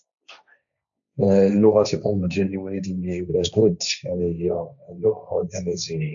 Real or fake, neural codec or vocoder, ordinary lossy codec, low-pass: fake; codec, 16 kHz, 1.1 kbps, Voila-Tokenizer; none; 7.2 kHz